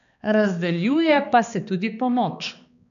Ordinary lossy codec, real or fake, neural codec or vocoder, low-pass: none; fake; codec, 16 kHz, 2 kbps, X-Codec, HuBERT features, trained on balanced general audio; 7.2 kHz